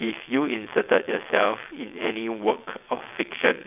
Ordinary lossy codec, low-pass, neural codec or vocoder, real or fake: none; 3.6 kHz; vocoder, 22.05 kHz, 80 mel bands, WaveNeXt; fake